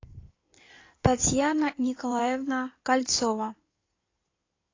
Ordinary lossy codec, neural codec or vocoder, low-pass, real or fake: AAC, 32 kbps; codec, 16 kHz in and 24 kHz out, 2.2 kbps, FireRedTTS-2 codec; 7.2 kHz; fake